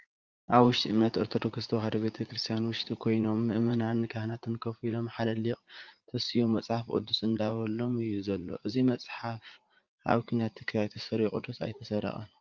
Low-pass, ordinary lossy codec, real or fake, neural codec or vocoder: 7.2 kHz; Opus, 24 kbps; fake; vocoder, 44.1 kHz, 80 mel bands, Vocos